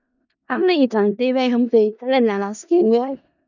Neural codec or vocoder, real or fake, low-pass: codec, 16 kHz in and 24 kHz out, 0.4 kbps, LongCat-Audio-Codec, four codebook decoder; fake; 7.2 kHz